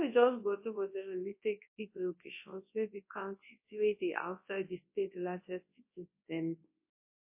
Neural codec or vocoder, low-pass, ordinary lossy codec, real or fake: codec, 24 kHz, 0.9 kbps, WavTokenizer, large speech release; 3.6 kHz; MP3, 24 kbps; fake